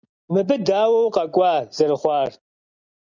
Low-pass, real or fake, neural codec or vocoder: 7.2 kHz; real; none